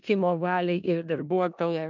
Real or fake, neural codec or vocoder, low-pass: fake; codec, 16 kHz in and 24 kHz out, 0.4 kbps, LongCat-Audio-Codec, four codebook decoder; 7.2 kHz